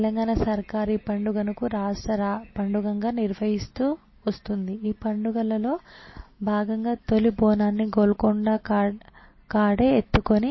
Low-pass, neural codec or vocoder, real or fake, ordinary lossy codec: 7.2 kHz; none; real; MP3, 24 kbps